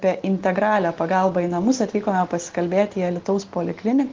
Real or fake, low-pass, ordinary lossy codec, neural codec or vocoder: real; 7.2 kHz; Opus, 16 kbps; none